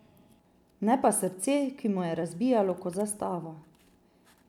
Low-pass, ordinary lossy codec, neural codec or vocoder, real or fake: 19.8 kHz; none; none; real